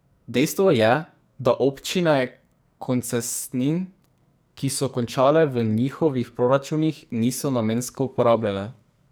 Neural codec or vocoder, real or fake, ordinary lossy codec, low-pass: codec, 44.1 kHz, 2.6 kbps, SNAC; fake; none; none